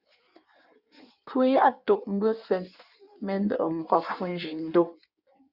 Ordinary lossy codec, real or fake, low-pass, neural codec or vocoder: Opus, 64 kbps; fake; 5.4 kHz; codec, 16 kHz in and 24 kHz out, 1.1 kbps, FireRedTTS-2 codec